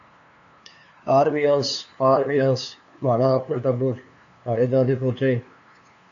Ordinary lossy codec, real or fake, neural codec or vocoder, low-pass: AAC, 64 kbps; fake; codec, 16 kHz, 2 kbps, FunCodec, trained on LibriTTS, 25 frames a second; 7.2 kHz